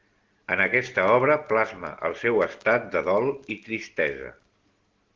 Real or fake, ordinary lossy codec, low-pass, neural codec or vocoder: real; Opus, 16 kbps; 7.2 kHz; none